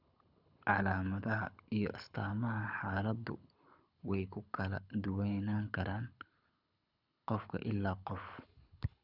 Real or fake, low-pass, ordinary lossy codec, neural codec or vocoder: fake; 5.4 kHz; none; codec, 24 kHz, 6 kbps, HILCodec